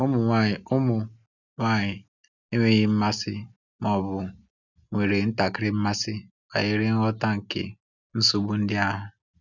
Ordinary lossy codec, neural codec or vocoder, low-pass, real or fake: none; none; 7.2 kHz; real